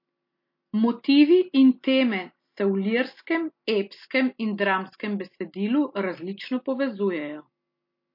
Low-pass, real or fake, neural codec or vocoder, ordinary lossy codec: 5.4 kHz; real; none; MP3, 32 kbps